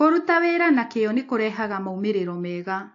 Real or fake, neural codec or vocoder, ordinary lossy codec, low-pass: real; none; AAC, 48 kbps; 7.2 kHz